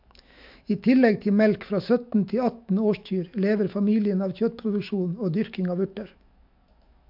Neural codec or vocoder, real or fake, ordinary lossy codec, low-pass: autoencoder, 48 kHz, 128 numbers a frame, DAC-VAE, trained on Japanese speech; fake; MP3, 48 kbps; 5.4 kHz